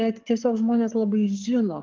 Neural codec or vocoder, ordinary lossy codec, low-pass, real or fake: codec, 44.1 kHz, 7.8 kbps, Pupu-Codec; Opus, 16 kbps; 7.2 kHz; fake